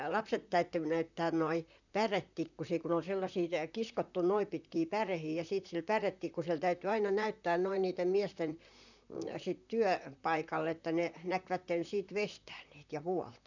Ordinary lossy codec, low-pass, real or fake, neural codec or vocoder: none; 7.2 kHz; fake; vocoder, 44.1 kHz, 128 mel bands, Pupu-Vocoder